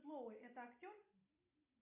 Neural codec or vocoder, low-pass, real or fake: none; 3.6 kHz; real